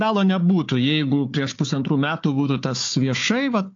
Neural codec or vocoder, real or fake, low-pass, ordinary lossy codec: codec, 16 kHz, 4 kbps, FunCodec, trained on Chinese and English, 50 frames a second; fake; 7.2 kHz; AAC, 48 kbps